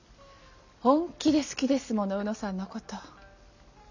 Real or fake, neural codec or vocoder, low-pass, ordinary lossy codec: real; none; 7.2 kHz; none